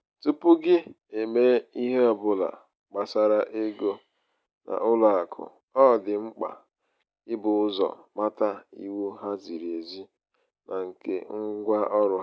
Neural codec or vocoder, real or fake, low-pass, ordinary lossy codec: none; real; none; none